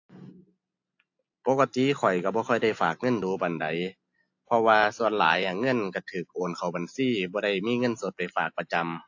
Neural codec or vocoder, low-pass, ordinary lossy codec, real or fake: none; 7.2 kHz; AAC, 48 kbps; real